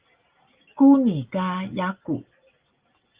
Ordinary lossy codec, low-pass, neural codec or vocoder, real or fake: Opus, 32 kbps; 3.6 kHz; none; real